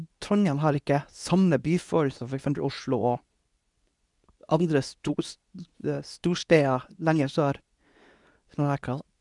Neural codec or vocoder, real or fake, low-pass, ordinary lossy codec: codec, 24 kHz, 0.9 kbps, WavTokenizer, medium speech release version 2; fake; 10.8 kHz; none